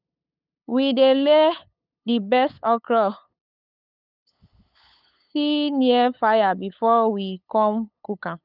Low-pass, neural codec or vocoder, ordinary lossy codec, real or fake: 5.4 kHz; codec, 16 kHz, 8 kbps, FunCodec, trained on LibriTTS, 25 frames a second; none; fake